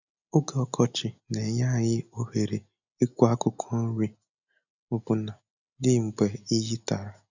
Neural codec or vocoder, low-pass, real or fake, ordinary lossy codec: none; 7.2 kHz; real; none